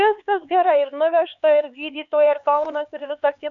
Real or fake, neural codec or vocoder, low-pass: fake; codec, 16 kHz, 4 kbps, X-Codec, HuBERT features, trained on LibriSpeech; 7.2 kHz